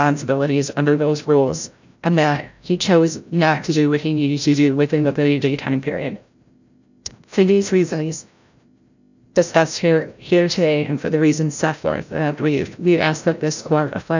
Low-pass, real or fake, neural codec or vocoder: 7.2 kHz; fake; codec, 16 kHz, 0.5 kbps, FreqCodec, larger model